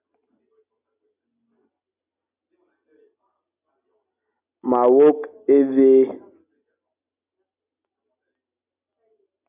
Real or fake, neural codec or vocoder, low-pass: real; none; 3.6 kHz